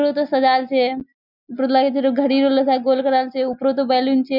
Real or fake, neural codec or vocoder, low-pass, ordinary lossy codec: real; none; 5.4 kHz; none